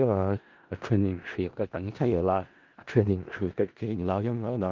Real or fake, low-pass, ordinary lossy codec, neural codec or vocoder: fake; 7.2 kHz; Opus, 32 kbps; codec, 16 kHz in and 24 kHz out, 0.4 kbps, LongCat-Audio-Codec, four codebook decoder